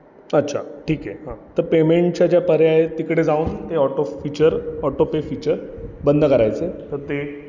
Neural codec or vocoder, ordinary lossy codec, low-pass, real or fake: none; none; 7.2 kHz; real